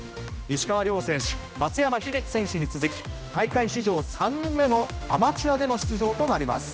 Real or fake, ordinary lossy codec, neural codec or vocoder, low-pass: fake; none; codec, 16 kHz, 1 kbps, X-Codec, HuBERT features, trained on general audio; none